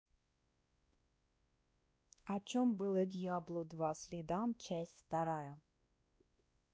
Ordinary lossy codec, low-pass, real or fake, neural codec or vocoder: none; none; fake; codec, 16 kHz, 1 kbps, X-Codec, WavLM features, trained on Multilingual LibriSpeech